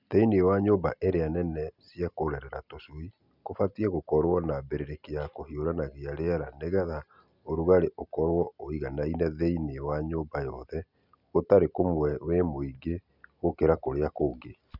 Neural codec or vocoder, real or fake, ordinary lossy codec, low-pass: none; real; none; 5.4 kHz